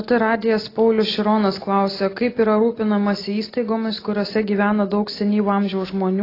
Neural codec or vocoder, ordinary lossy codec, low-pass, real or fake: none; AAC, 24 kbps; 5.4 kHz; real